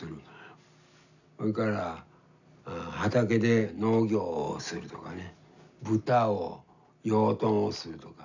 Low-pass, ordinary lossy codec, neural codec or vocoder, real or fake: 7.2 kHz; none; none; real